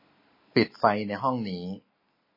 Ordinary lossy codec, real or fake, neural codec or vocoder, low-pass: MP3, 24 kbps; real; none; 5.4 kHz